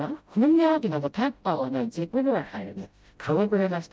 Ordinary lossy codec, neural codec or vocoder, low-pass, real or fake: none; codec, 16 kHz, 0.5 kbps, FreqCodec, smaller model; none; fake